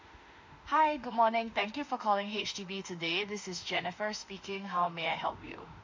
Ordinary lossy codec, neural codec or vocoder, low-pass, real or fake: MP3, 48 kbps; autoencoder, 48 kHz, 32 numbers a frame, DAC-VAE, trained on Japanese speech; 7.2 kHz; fake